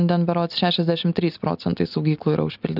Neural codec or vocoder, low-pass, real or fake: none; 5.4 kHz; real